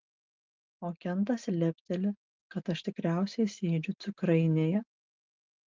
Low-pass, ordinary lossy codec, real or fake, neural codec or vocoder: 7.2 kHz; Opus, 24 kbps; real; none